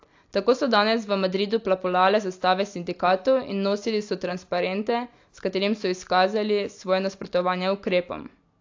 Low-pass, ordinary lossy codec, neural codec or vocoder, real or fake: 7.2 kHz; AAC, 48 kbps; none; real